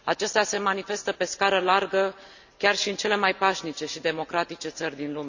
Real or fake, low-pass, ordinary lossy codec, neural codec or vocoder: real; 7.2 kHz; none; none